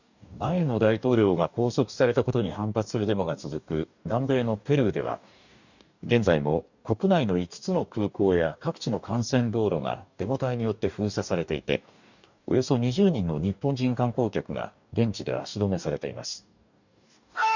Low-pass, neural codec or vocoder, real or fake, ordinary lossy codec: 7.2 kHz; codec, 44.1 kHz, 2.6 kbps, DAC; fake; none